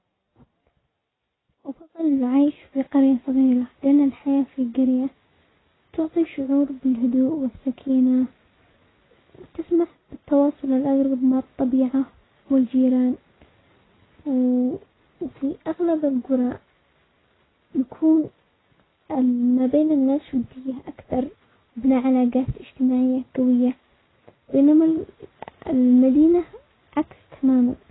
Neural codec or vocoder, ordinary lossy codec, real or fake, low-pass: none; AAC, 16 kbps; real; 7.2 kHz